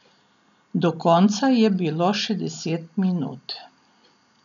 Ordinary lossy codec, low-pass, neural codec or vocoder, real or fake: none; 7.2 kHz; none; real